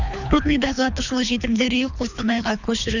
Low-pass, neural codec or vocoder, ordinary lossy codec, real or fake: 7.2 kHz; codec, 24 kHz, 3 kbps, HILCodec; none; fake